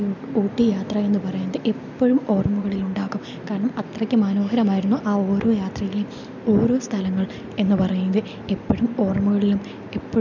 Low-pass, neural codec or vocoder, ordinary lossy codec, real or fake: 7.2 kHz; none; none; real